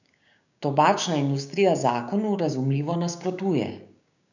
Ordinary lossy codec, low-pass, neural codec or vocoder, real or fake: none; 7.2 kHz; codec, 16 kHz, 6 kbps, DAC; fake